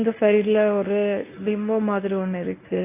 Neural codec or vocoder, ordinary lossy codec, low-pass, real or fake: codec, 24 kHz, 0.9 kbps, WavTokenizer, medium speech release version 1; AAC, 24 kbps; 3.6 kHz; fake